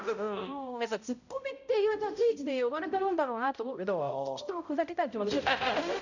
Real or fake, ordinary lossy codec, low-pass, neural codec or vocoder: fake; none; 7.2 kHz; codec, 16 kHz, 0.5 kbps, X-Codec, HuBERT features, trained on balanced general audio